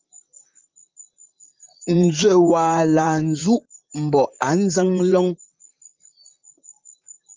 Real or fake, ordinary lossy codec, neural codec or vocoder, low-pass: fake; Opus, 32 kbps; vocoder, 44.1 kHz, 128 mel bands, Pupu-Vocoder; 7.2 kHz